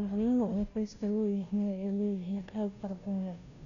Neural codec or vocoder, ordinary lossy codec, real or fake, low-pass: codec, 16 kHz, 0.5 kbps, FunCodec, trained on Chinese and English, 25 frames a second; none; fake; 7.2 kHz